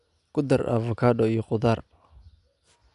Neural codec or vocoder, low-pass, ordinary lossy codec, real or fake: none; 10.8 kHz; none; real